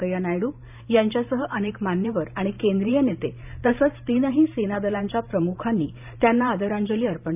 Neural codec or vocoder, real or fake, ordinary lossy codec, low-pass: vocoder, 44.1 kHz, 128 mel bands every 512 samples, BigVGAN v2; fake; none; 3.6 kHz